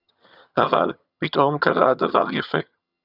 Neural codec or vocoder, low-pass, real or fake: vocoder, 22.05 kHz, 80 mel bands, HiFi-GAN; 5.4 kHz; fake